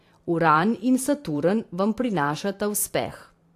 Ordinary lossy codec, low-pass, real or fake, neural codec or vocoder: AAC, 64 kbps; 14.4 kHz; fake; vocoder, 44.1 kHz, 128 mel bands every 512 samples, BigVGAN v2